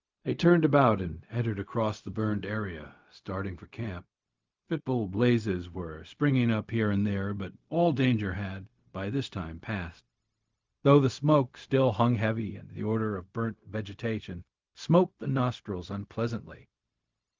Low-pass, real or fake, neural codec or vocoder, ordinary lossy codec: 7.2 kHz; fake; codec, 16 kHz, 0.4 kbps, LongCat-Audio-Codec; Opus, 24 kbps